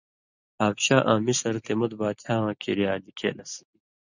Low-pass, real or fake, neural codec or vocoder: 7.2 kHz; real; none